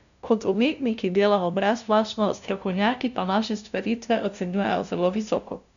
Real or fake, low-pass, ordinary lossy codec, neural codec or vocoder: fake; 7.2 kHz; none; codec, 16 kHz, 0.5 kbps, FunCodec, trained on LibriTTS, 25 frames a second